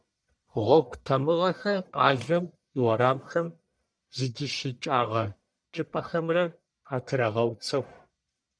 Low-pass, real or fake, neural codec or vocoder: 9.9 kHz; fake; codec, 44.1 kHz, 1.7 kbps, Pupu-Codec